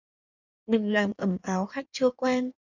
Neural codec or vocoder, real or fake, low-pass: codec, 16 kHz in and 24 kHz out, 1.1 kbps, FireRedTTS-2 codec; fake; 7.2 kHz